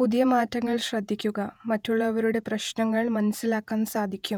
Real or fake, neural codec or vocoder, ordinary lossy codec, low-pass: fake; vocoder, 48 kHz, 128 mel bands, Vocos; none; 19.8 kHz